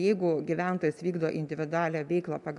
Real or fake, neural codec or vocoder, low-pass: real; none; 10.8 kHz